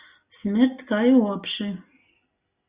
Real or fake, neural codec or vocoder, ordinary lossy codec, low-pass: real; none; Opus, 64 kbps; 3.6 kHz